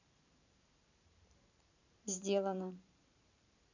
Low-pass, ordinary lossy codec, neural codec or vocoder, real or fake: 7.2 kHz; none; vocoder, 44.1 kHz, 128 mel bands every 512 samples, BigVGAN v2; fake